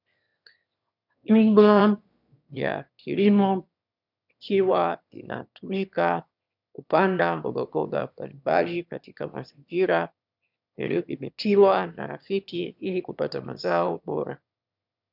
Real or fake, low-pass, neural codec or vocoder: fake; 5.4 kHz; autoencoder, 22.05 kHz, a latent of 192 numbers a frame, VITS, trained on one speaker